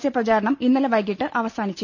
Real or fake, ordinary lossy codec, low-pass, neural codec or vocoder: real; none; 7.2 kHz; none